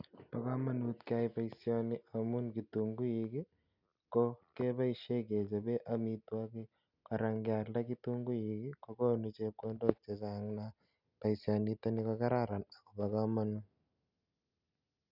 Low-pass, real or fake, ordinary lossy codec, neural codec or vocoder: 5.4 kHz; real; none; none